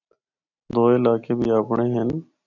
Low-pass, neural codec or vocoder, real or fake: 7.2 kHz; none; real